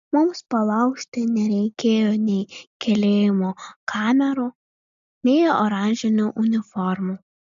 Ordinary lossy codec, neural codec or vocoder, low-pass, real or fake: MP3, 64 kbps; none; 7.2 kHz; real